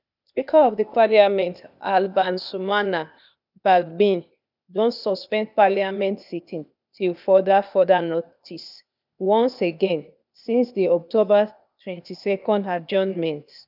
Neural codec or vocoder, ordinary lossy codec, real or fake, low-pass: codec, 16 kHz, 0.8 kbps, ZipCodec; none; fake; 5.4 kHz